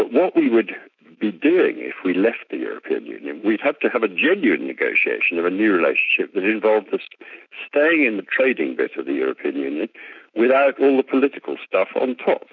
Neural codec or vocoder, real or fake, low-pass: autoencoder, 48 kHz, 128 numbers a frame, DAC-VAE, trained on Japanese speech; fake; 7.2 kHz